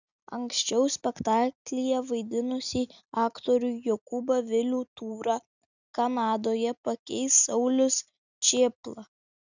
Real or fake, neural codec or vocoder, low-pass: real; none; 7.2 kHz